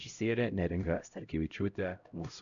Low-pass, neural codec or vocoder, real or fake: 7.2 kHz; codec, 16 kHz, 0.5 kbps, X-Codec, HuBERT features, trained on LibriSpeech; fake